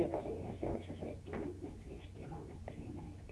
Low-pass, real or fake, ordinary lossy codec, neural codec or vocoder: none; fake; none; codec, 24 kHz, 0.9 kbps, WavTokenizer, medium speech release version 2